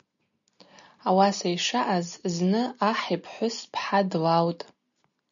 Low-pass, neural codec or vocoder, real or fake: 7.2 kHz; none; real